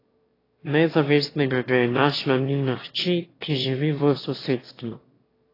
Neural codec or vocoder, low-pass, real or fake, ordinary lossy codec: autoencoder, 22.05 kHz, a latent of 192 numbers a frame, VITS, trained on one speaker; 5.4 kHz; fake; AAC, 24 kbps